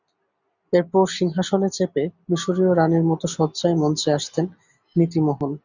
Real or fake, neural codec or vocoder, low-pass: real; none; 7.2 kHz